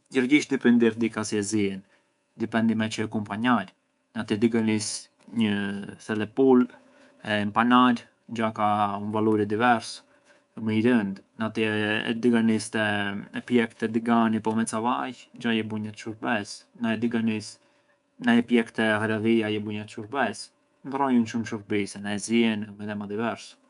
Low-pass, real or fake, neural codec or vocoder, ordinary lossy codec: 10.8 kHz; fake; codec, 24 kHz, 3.1 kbps, DualCodec; none